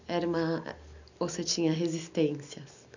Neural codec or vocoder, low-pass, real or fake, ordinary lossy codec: none; 7.2 kHz; real; none